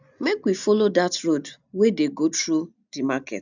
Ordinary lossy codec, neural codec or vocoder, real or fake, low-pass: none; none; real; 7.2 kHz